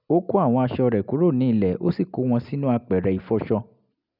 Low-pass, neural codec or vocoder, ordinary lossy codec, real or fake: 5.4 kHz; none; none; real